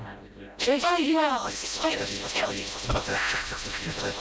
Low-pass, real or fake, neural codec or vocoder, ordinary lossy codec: none; fake; codec, 16 kHz, 0.5 kbps, FreqCodec, smaller model; none